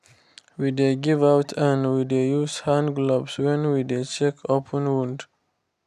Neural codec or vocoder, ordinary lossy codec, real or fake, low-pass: none; none; real; 14.4 kHz